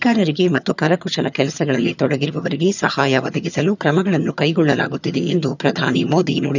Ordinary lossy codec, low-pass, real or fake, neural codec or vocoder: none; 7.2 kHz; fake; vocoder, 22.05 kHz, 80 mel bands, HiFi-GAN